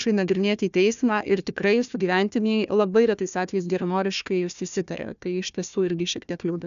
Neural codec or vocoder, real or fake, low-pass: codec, 16 kHz, 1 kbps, FunCodec, trained on Chinese and English, 50 frames a second; fake; 7.2 kHz